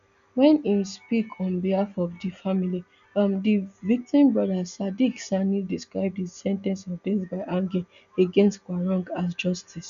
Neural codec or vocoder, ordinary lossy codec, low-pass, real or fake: none; none; 7.2 kHz; real